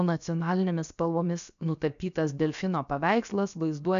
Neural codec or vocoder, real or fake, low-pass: codec, 16 kHz, about 1 kbps, DyCAST, with the encoder's durations; fake; 7.2 kHz